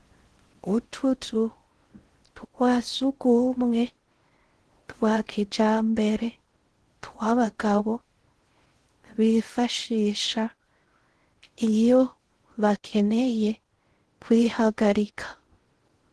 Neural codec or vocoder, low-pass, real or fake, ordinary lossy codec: codec, 16 kHz in and 24 kHz out, 0.6 kbps, FocalCodec, streaming, 4096 codes; 10.8 kHz; fake; Opus, 16 kbps